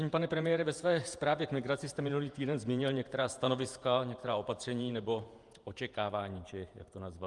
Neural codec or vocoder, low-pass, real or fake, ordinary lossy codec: vocoder, 48 kHz, 128 mel bands, Vocos; 10.8 kHz; fake; Opus, 32 kbps